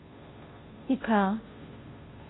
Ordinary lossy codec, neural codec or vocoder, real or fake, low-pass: AAC, 16 kbps; codec, 16 kHz, 0.5 kbps, FunCodec, trained on Chinese and English, 25 frames a second; fake; 7.2 kHz